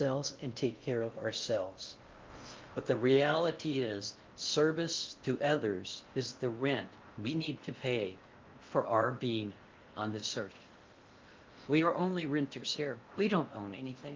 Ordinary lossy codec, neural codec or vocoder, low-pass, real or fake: Opus, 32 kbps; codec, 16 kHz in and 24 kHz out, 0.6 kbps, FocalCodec, streaming, 4096 codes; 7.2 kHz; fake